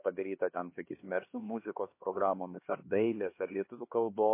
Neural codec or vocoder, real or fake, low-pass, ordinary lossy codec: codec, 16 kHz, 2 kbps, X-Codec, HuBERT features, trained on LibriSpeech; fake; 3.6 kHz; MP3, 24 kbps